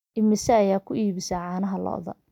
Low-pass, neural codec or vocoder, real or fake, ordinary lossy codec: 19.8 kHz; none; real; none